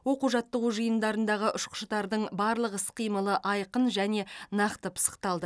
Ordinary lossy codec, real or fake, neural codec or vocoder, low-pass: none; real; none; none